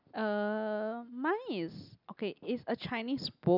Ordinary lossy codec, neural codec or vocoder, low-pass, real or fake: none; none; 5.4 kHz; real